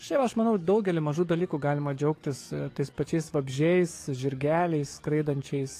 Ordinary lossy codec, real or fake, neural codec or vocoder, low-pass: AAC, 48 kbps; fake; codec, 44.1 kHz, 7.8 kbps, DAC; 14.4 kHz